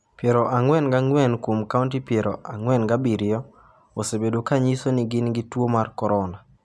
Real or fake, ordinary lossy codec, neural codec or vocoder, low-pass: real; none; none; none